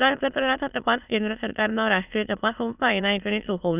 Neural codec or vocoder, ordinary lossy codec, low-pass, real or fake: autoencoder, 22.05 kHz, a latent of 192 numbers a frame, VITS, trained on many speakers; none; 3.6 kHz; fake